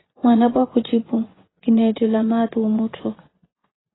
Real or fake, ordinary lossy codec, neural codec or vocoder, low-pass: fake; AAC, 16 kbps; vocoder, 24 kHz, 100 mel bands, Vocos; 7.2 kHz